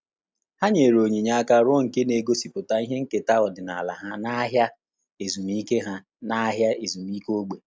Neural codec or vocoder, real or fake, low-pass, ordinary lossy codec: none; real; none; none